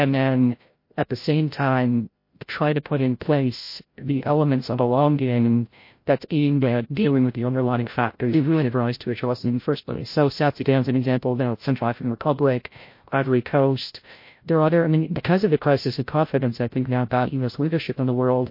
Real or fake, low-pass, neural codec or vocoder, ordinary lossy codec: fake; 5.4 kHz; codec, 16 kHz, 0.5 kbps, FreqCodec, larger model; MP3, 32 kbps